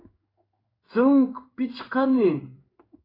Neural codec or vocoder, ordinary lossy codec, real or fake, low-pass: codec, 16 kHz in and 24 kHz out, 1 kbps, XY-Tokenizer; AAC, 24 kbps; fake; 5.4 kHz